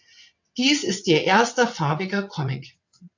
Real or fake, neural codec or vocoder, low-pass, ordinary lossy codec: fake; vocoder, 22.05 kHz, 80 mel bands, WaveNeXt; 7.2 kHz; AAC, 48 kbps